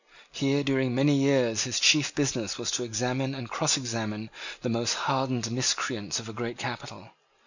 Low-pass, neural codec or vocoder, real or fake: 7.2 kHz; none; real